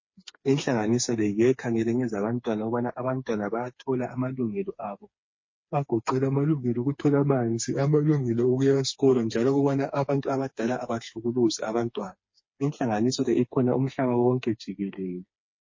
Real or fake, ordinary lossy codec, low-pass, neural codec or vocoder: fake; MP3, 32 kbps; 7.2 kHz; codec, 16 kHz, 4 kbps, FreqCodec, smaller model